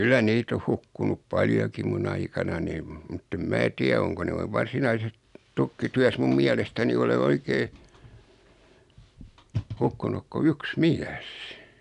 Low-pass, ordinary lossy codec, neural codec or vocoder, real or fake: 10.8 kHz; none; none; real